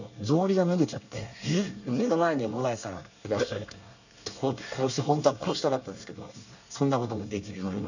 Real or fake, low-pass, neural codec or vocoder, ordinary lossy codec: fake; 7.2 kHz; codec, 24 kHz, 1 kbps, SNAC; none